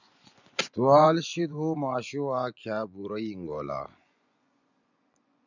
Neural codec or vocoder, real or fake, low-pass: vocoder, 24 kHz, 100 mel bands, Vocos; fake; 7.2 kHz